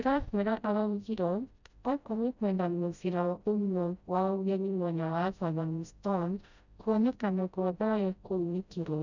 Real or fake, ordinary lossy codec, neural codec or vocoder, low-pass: fake; none; codec, 16 kHz, 0.5 kbps, FreqCodec, smaller model; 7.2 kHz